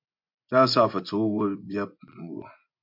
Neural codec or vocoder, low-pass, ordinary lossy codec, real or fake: vocoder, 24 kHz, 100 mel bands, Vocos; 5.4 kHz; MP3, 48 kbps; fake